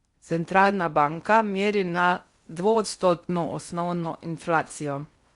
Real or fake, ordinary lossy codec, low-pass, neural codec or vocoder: fake; Opus, 64 kbps; 10.8 kHz; codec, 16 kHz in and 24 kHz out, 0.6 kbps, FocalCodec, streaming, 4096 codes